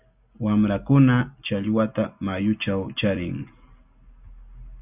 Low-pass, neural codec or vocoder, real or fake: 3.6 kHz; none; real